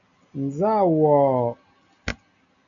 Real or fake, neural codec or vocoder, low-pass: real; none; 7.2 kHz